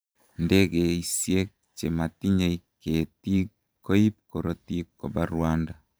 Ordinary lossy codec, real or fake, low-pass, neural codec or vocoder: none; fake; none; vocoder, 44.1 kHz, 128 mel bands every 512 samples, BigVGAN v2